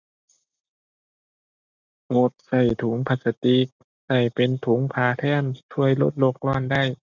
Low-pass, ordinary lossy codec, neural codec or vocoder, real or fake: 7.2 kHz; none; none; real